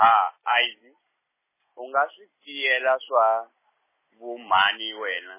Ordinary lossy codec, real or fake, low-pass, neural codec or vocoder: MP3, 16 kbps; real; 3.6 kHz; none